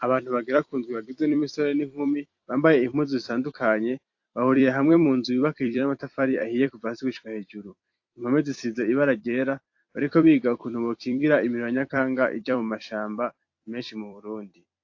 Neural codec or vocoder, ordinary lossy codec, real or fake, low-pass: vocoder, 44.1 kHz, 128 mel bands every 256 samples, BigVGAN v2; AAC, 48 kbps; fake; 7.2 kHz